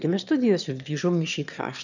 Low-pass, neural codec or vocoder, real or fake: 7.2 kHz; autoencoder, 22.05 kHz, a latent of 192 numbers a frame, VITS, trained on one speaker; fake